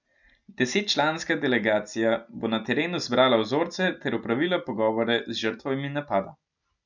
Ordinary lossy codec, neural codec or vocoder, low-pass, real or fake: none; none; 7.2 kHz; real